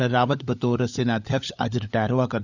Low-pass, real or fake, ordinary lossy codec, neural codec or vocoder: 7.2 kHz; fake; none; codec, 16 kHz, 16 kbps, FunCodec, trained on LibriTTS, 50 frames a second